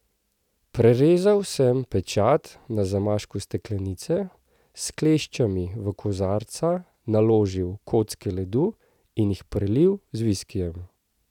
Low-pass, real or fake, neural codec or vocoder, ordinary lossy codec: 19.8 kHz; real; none; none